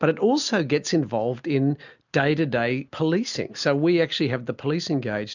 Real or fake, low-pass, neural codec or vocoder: real; 7.2 kHz; none